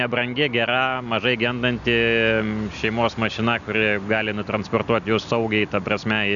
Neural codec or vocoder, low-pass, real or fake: none; 7.2 kHz; real